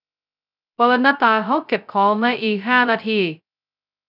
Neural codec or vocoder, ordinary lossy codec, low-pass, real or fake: codec, 16 kHz, 0.2 kbps, FocalCodec; none; 5.4 kHz; fake